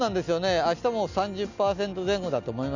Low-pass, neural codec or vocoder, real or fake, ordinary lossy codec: 7.2 kHz; none; real; none